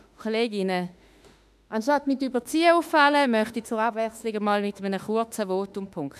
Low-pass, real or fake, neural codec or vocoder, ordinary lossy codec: 14.4 kHz; fake; autoencoder, 48 kHz, 32 numbers a frame, DAC-VAE, trained on Japanese speech; none